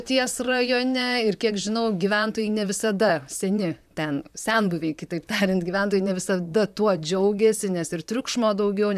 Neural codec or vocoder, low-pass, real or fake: vocoder, 44.1 kHz, 128 mel bands, Pupu-Vocoder; 14.4 kHz; fake